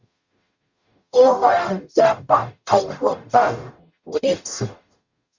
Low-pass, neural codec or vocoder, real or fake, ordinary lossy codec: 7.2 kHz; codec, 44.1 kHz, 0.9 kbps, DAC; fake; Opus, 64 kbps